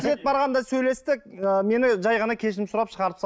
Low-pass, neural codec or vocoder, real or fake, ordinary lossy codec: none; none; real; none